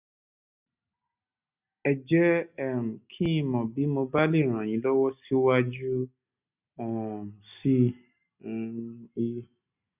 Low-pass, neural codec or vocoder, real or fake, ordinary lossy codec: 3.6 kHz; none; real; none